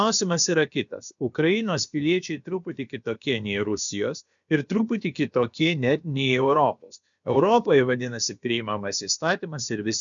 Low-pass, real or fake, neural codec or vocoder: 7.2 kHz; fake; codec, 16 kHz, about 1 kbps, DyCAST, with the encoder's durations